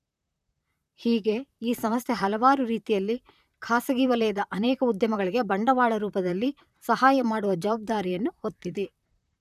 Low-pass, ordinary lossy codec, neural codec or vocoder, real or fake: 14.4 kHz; none; vocoder, 44.1 kHz, 128 mel bands, Pupu-Vocoder; fake